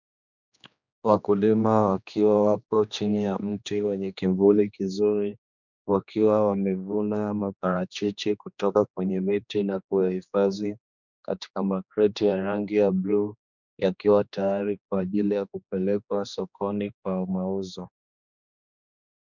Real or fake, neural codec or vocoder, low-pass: fake; codec, 16 kHz, 2 kbps, X-Codec, HuBERT features, trained on general audio; 7.2 kHz